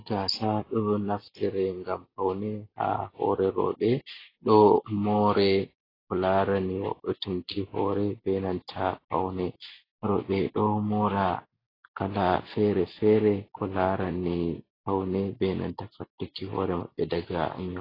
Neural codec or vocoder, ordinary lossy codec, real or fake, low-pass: none; AAC, 24 kbps; real; 5.4 kHz